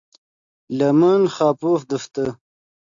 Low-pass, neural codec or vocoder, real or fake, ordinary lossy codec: 7.2 kHz; none; real; AAC, 64 kbps